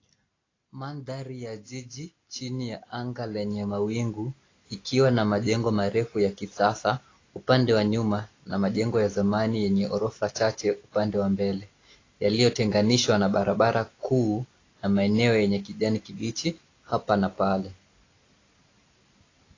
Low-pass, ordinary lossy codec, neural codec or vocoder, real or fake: 7.2 kHz; AAC, 32 kbps; none; real